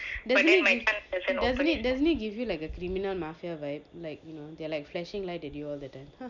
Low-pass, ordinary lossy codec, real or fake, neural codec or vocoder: 7.2 kHz; none; real; none